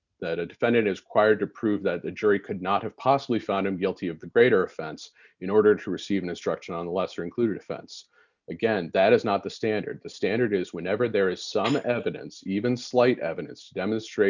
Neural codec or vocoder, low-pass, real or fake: none; 7.2 kHz; real